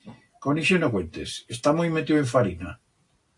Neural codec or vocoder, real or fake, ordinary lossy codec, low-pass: none; real; AAC, 48 kbps; 10.8 kHz